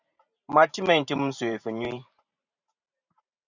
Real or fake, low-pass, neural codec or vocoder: fake; 7.2 kHz; vocoder, 44.1 kHz, 128 mel bands every 512 samples, BigVGAN v2